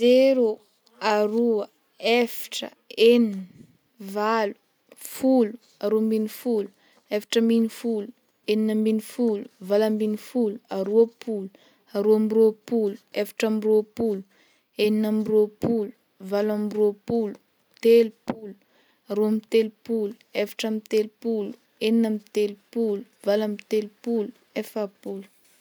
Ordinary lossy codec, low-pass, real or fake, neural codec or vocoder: none; none; real; none